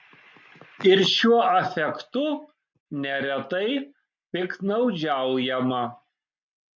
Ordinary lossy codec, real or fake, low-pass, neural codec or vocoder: MP3, 64 kbps; real; 7.2 kHz; none